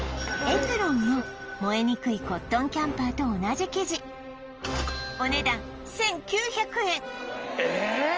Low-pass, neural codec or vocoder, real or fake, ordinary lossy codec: 7.2 kHz; none; real; Opus, 24 kbps